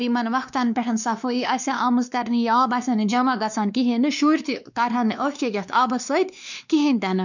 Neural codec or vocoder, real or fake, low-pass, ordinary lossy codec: codec, 16 kHz, 2 kbps, X-Codec, WavLM features, trained on Multilingual LibriSpeech; fake; 7.2 kHz; none